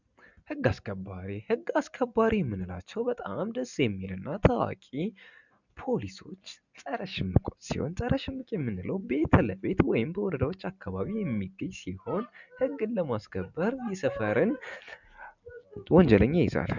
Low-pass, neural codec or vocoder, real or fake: 7.2 kHz; none; real